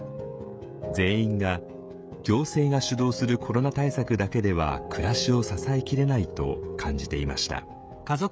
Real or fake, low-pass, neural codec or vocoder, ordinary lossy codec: fake; none; codec, 16 kHz, 16 kbps, FreqCodec, smaller model; none